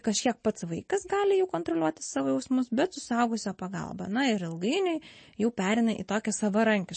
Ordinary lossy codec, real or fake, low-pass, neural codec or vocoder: MP3, 32 kbps; real; 9.9 kHz; none